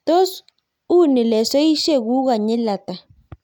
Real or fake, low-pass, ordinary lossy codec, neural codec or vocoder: real; 19.8 kHz; none; none